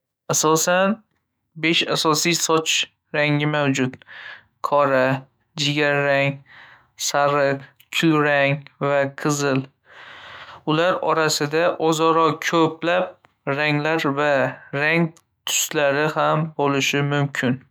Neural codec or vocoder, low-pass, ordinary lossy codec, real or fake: autoencoder, 48 kHz, 128 numbers a frame, DAC-VAE, trained on Japanese speech; none; none; fake